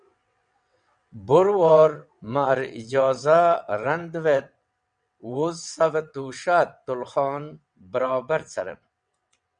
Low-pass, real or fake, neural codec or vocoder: 9.9 kHz; fake; vocoder, 22.05 kHz, 80 mel bands, WaveNeXt